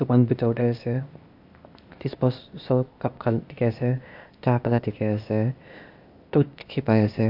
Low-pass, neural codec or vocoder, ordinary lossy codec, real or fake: 5.4 kHz; codec, 16 kHz, 0.8 kbps, ZipCodec; AAC, 48 kbps; fake